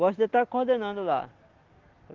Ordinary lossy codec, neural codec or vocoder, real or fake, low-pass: Opus, 16 kbps; none; real; 7.2 kHz